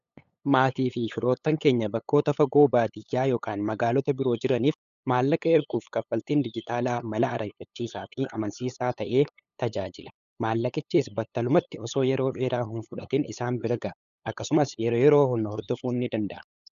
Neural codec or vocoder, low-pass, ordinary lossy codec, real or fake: codec, 16 kHz, 8 kbps, FunCodec, trained on LibriTTS, 25 frames a second; 7.2 kHz; MP3, 96 kbps; fake